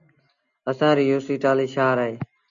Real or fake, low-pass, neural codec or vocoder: real; 7.2 kHz; none